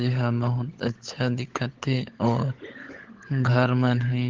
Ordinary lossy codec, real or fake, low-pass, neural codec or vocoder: Opus, 16 kbps; fake; 7.2 kHz; codec, 16 kHz, 16 kbps, FunCodec, trained on LibriTTS, 50 frames a second